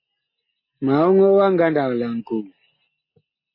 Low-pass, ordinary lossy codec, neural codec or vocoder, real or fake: 5.4 kHz; MP3, 24 kbps; none; real